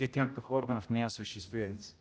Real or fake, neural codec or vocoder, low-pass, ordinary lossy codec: fake; codec, 16 kHz, 0.5 kbps, X-Codec, HuBERT features, trained on general audio; none; none